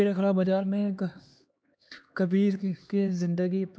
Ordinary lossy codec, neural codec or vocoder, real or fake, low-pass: none; codec, 16 kHz, 2 kbps, X-Codec, HuBERT features, trained on LibriSpeech; fake; none